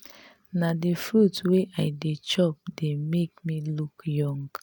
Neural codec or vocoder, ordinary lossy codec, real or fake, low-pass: none; none; real; none